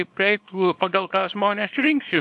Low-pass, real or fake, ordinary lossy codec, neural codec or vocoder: 10.8 kHz; fake; AAC, 64 kbps; codec, 24 kHz, 0.9 kbps, WavTokenizer, small release